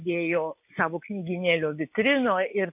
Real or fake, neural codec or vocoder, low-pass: real; none; 3.6 kHz